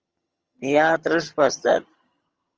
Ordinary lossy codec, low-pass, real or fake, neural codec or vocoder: Opus, 24 kbps; 7.2 kHz; fake; vocoder, 22.05 kHz, 80 mel bands, HiFi-GAN